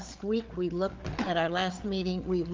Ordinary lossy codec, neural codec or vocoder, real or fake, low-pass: Opus, 24 kbps; codec, 16 kHz, 8 kbps, FreqCodec, larger model; fake; 7.2 kHz